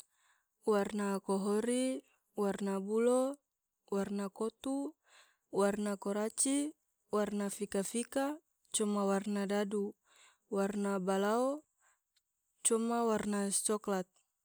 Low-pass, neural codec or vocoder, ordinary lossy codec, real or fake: none; none; none; real